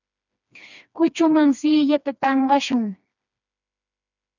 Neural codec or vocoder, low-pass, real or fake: codec, 16 kHz, 2 kbps, FreqCodec, smaller model; 7.2 kHz; fake